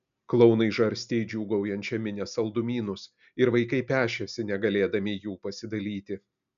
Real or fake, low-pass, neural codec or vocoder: real; 7.2 kHz; none